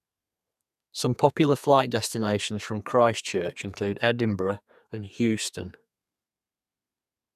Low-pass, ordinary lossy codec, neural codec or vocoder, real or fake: 14.4 kHz; none; codec, 32 kHz, 1.9 kbps, SNAC; fake